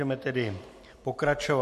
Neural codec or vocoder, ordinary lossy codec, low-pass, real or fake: none; MP3, 64 kbps; 14.4 kHz; real